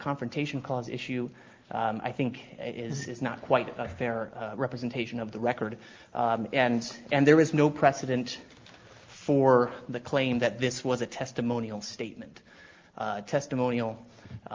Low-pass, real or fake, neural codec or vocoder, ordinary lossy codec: 7.2 kHz; real; none; Opus, 24 kbps